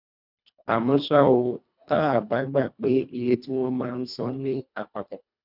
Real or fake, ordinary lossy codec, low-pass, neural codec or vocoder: fake; none; 5.4 kHz; codec, 24 kHz, 1.5 kbps, HILCodec